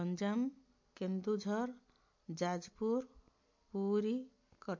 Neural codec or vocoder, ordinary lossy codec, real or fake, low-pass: none; MP3, 48 kbps; real; 7.2 kHz